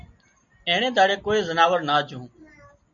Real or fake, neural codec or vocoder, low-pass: real; none; 7.2 kHz